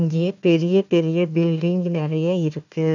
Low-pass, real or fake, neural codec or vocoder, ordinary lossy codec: 7.2 kHz; fake; codec, 16 kHz, 1 kbps, FunCodec, trained on Chinese and English, 50 frames a second; none